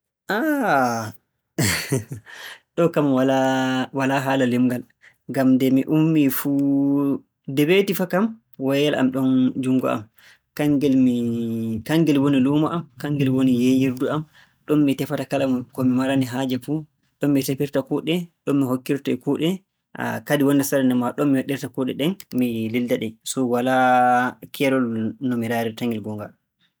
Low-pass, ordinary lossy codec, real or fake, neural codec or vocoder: none; none; real; none